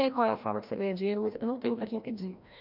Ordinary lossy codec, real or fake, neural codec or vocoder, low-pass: none; fake; codec, 16 kHz, 1 kbps, FreqCodec, larger model; 5.4 kHz